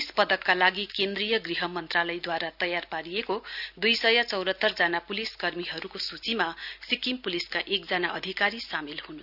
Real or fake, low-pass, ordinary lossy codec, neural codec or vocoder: real; 5.4 kHz; none; none